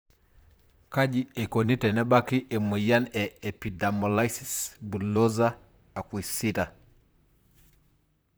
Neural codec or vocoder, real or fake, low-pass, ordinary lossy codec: vocoder, 44.1 kHz, 128 mel bands, Pupu-Vocoder; fake; none; none